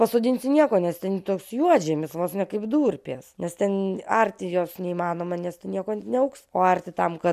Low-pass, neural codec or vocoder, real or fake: 14.4 kHz; none; real